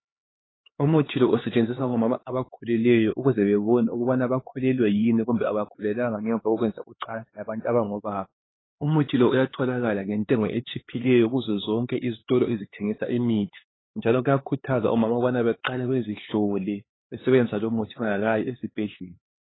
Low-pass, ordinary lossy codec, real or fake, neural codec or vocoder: 7.2 kHz; AAC, 16 kbps; fake; codec, 16 kHz, 4 kbps, X-Codec, HuBERT features, trained on LibriSpeech